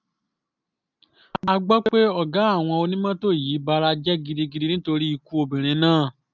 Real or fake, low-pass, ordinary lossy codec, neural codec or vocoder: real; 7.2 kHz; none; none